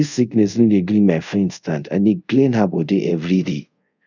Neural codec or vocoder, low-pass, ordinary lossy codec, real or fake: codec, 24 kHz, 0.5 kbps, DualCodec; 7.2 kHz; none; fake